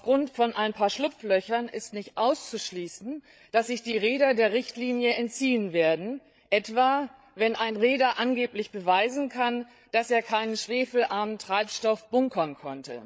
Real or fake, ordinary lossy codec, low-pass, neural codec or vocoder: fake; none; none; codec, 16 kHz, 8 kbps, FreqCodec, larger model